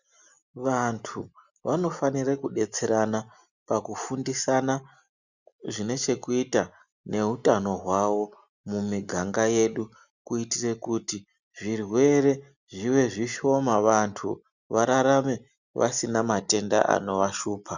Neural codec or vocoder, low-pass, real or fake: none; 7.2 kHz; real